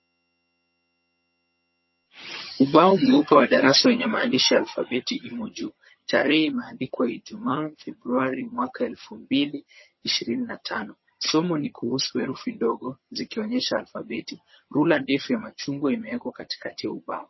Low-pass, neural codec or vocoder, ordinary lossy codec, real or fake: 7.2 kHz; vocoder, 22.05 kHz, 80 mel bands, HiFi-GAN; MP3, 24 kbps; fake